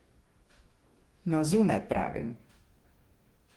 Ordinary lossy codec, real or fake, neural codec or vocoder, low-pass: Opus, 24 kbps; fake; codec, 44.1 kHz, 2.6 kbps, DAC; 19.8 kHz